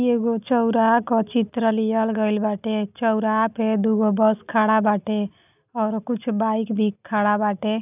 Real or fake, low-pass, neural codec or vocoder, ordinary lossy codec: real; 3.6 kHz; none; none